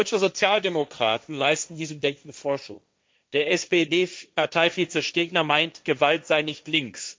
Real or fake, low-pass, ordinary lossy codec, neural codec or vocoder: fake; none; none; codec, 16 kHz, 1.1 kbps, Voila-Tokenizer